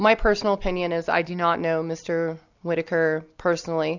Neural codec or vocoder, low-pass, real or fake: none; 7.2 kHz; real